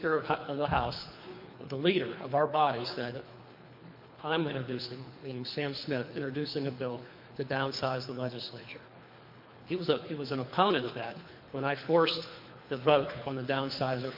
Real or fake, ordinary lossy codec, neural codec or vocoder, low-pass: fake; MP3, 32 kbps; codec, 24 kHz, 3 kbps, HILCodec; 5.4 kHz